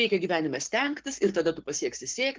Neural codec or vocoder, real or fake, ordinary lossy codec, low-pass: codec, 44.1 kHz, 7.8 kbps, Pupu-Codec; fake; Opus, 16 kbps; 7.2 kHz